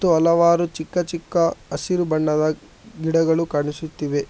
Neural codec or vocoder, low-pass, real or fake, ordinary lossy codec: none; none; real; none